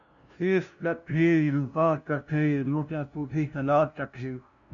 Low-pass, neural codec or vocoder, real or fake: 7.2 kHz; codec, 16 kHz, 0.5 kbps, FunCodec, trained on LibriTTS, 25 frames a second; fake